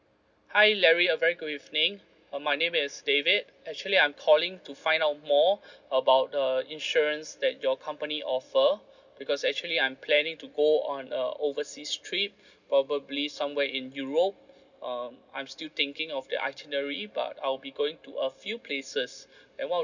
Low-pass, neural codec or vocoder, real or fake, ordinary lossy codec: 7.2 kHz; none; real; none